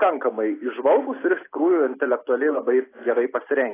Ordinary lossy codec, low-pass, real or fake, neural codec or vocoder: AAC, 16 kbps; 3.6 kHz; real; none